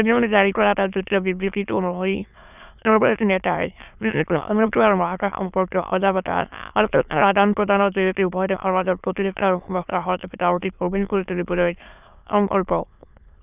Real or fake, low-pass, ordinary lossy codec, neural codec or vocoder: fake; 3.6 kHz; none; autoencoder, 22.05 kHz, a latent of 192 numbers a frame, VITS, trained on many speakers